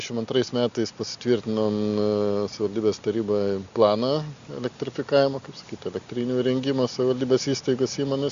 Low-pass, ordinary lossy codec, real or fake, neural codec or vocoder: 7.2 kHz; Opus, 64 kbps; real; none